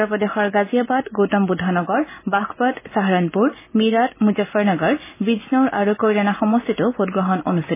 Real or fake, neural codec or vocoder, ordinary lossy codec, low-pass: real; none; MP3, 16 kbps; 3.6 kHz